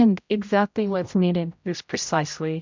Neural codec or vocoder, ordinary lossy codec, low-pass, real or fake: codec, 16 kHz, 0.5 kbps, X-Codec, HuBERT features, trained on general audio; MP3, 64 kbps; 7.2 kHz; fake